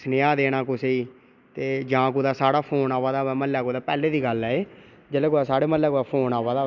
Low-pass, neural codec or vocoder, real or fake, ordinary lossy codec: 7.2 kHz; none; real; none